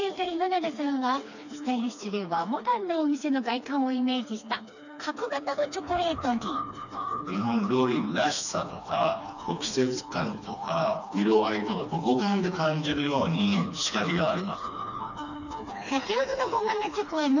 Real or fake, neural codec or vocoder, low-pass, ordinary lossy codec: fake; codec, 16 kHz, 2 kbps, FreqCodec, smaller model; 7.2 kHz; none